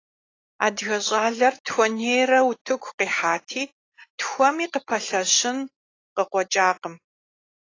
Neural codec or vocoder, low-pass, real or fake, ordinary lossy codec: none; 7.2 kHz; real; AAC, 32 kbps